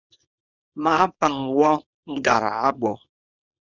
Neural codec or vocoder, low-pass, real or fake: codec, 24 kHz, 0.9 kbps, WavTokenizer, small release; 7.2 kHz; fake